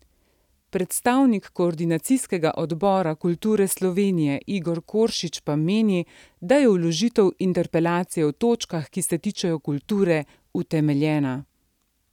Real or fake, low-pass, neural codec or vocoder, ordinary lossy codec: real; 19.8 kHz; none; none